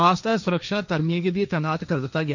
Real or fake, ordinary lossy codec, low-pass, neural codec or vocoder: fake; none; 7.2 kHz; codec, 16 kHz, 1.1 kbps, Voila-Tokenizer